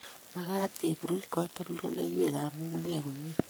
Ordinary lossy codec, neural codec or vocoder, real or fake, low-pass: none; codec, 44.1 kHz, 3.4 kbps, Pupu-Codec; fake; none